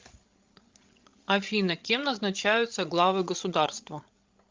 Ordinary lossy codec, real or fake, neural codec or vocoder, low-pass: Opus, 24 kbps; fake; codec, 16 kHz, 16 kbps, FreqCodec, larger model; 7.2 kHz